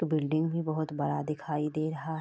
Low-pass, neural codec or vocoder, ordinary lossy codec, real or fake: none; none; none; real